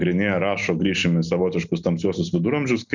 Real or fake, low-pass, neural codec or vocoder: real; 7.2 kHz; none